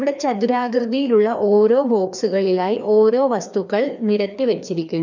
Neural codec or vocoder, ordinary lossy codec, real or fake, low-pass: codec, 16 kHz, 2 kbps, FreqCodec, larger model; none; fake; 7.2 kHz